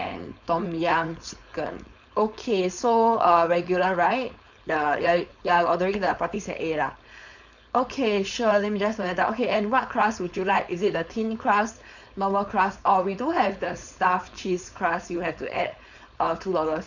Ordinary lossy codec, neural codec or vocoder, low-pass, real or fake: none; codec, 16 kHz, 4.8 kbps, FACodec; 7.2 kHz; fake